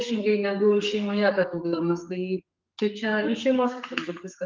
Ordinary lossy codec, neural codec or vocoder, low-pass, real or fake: Opus, 24 kbps; codec, 32 kHz, 1.9 kbps, SNAC; 7.2 kHz; fake